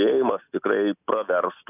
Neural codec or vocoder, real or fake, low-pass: none; real; 3.6 kHz